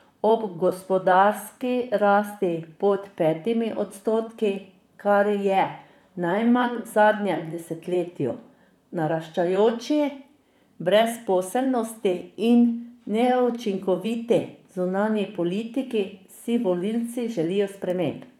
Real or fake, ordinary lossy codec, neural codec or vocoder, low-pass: fake; none; vocoder, 44.1 kHz, 128 mel bands, Pupu-Vocoder; 19.8 kHz